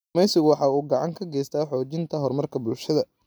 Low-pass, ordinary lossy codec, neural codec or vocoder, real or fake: none; none; none; real